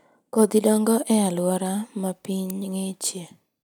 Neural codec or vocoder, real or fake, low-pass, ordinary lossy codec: none; real; none; none